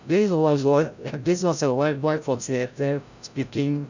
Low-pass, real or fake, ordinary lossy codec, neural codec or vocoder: 7.2 kHz; fake; none; codec, 16 kHz, 0.5 kbps, FreqCodec, larger model